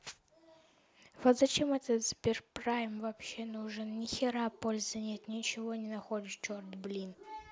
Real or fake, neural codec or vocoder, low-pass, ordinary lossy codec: real; none; none; none